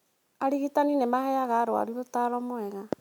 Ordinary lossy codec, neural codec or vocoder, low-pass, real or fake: none; none; 19.8 kHz; real